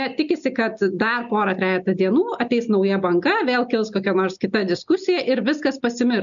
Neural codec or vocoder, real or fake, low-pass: none; real; 7.2 kHz